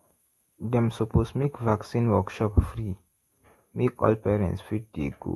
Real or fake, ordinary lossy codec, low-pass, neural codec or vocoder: fake; AAC, 32 kbps; 19.8 kHz; autoencoder, 48 kHz, 128 numbers a frame, DAC-VAE, trained on Japanese speech